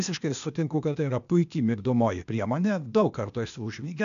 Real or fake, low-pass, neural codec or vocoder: fake; 7.2 kHz; codec, 16 kHz, 0.8 kbps, ZipCodec